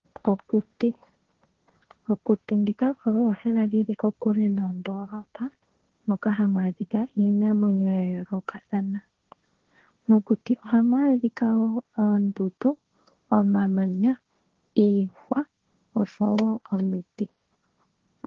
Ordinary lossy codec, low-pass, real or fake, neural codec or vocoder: Opus, 16 kbps; 7.2 kHz; fake; codec, 16 kHz, 1.1 kbps, Voila-Tokenizer